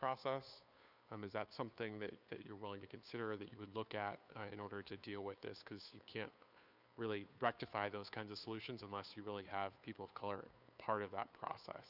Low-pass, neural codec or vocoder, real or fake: 5.4 kHz; codec, 16 kHz, 2 kbps, FunCodec, trained on Chinese and English, 25 frames a second; fake